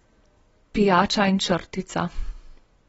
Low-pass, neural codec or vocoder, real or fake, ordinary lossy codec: 9.9 kHz; none; real; AAC, 24 kbps